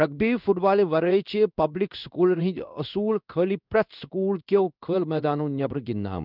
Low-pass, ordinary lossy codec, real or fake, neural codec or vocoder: 5.4 kHz; none; fake; codec, 16 kHz in and 24 kHz out, 1 kbps, XY-Tokenizer